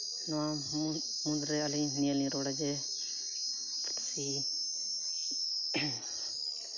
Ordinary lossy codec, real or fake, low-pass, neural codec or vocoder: none; real; 7.2 kHz; none